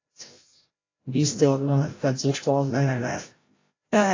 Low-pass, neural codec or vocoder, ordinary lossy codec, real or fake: 7.2 kHz; codec, 16 kHz, 0.5 kbps, FreqCodec, larger model; AAC, 32 kbps; fake